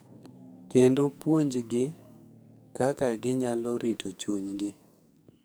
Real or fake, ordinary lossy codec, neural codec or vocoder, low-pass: fake; none; codec, 44.1 kHz, 2.6 kbps, SNAC; none